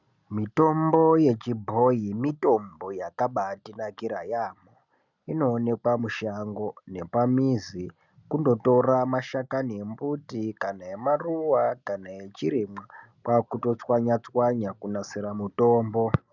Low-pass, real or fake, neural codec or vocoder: 7.2 kHz; real; none